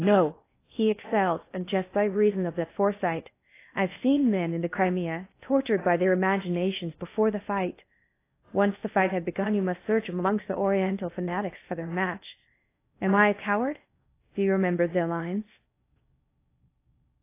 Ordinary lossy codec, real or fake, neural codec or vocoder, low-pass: AAC, 24 kbps; fake; codec, 16 kHz in and 24 kHz out, 0.6 kbps, FocalCodec, streaming, 4096 codes; 3.6 kHz